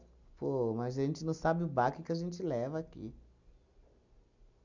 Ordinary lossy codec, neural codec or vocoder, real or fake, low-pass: none; none; real; 7.2 kHz